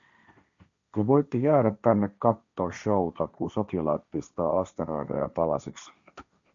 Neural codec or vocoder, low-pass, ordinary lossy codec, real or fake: codec, 16 kHz, 1.1 kbps, Voila-Tokenizer; 7.2 kHz; MP3, 96 kbps; fake